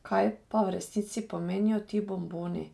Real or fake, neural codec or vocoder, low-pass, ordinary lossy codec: real; none; none; none